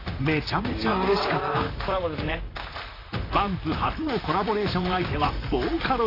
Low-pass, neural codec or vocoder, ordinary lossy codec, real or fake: 5.4 kHz; vocoder, 44.1 kHz, 128 mel bands, Pupu-Vocoder; AAC, 24 kbps; fake